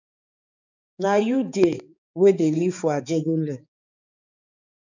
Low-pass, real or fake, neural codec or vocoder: 7.2 kHz; fake; codec, 16 kHz, 4 kbps, X-Codec, HuBERT features, trained on balanced general audio